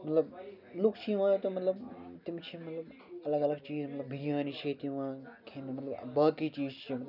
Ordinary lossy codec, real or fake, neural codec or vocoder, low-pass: none; real; none; 5.4 kHz